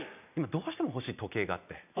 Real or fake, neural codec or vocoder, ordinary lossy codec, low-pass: real; none; none; 3.6 kHz